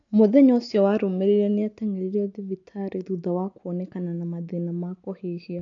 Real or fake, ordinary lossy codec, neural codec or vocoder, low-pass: real; AAC, 48 kbps; none; 7.2 kHz